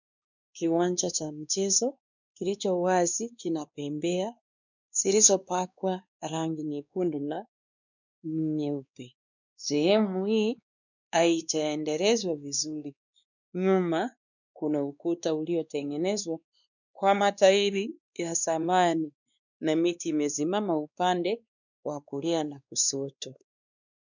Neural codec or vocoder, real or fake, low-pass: codec, 16 kHz, 2 kbps, X-Codec, WavLM features, trained on Multilingual LibriSpeech; fake; 7.2 kHz